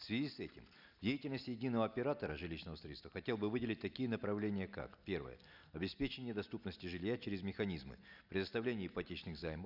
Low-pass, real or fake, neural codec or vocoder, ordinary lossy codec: 5.4 kHz; real; none; none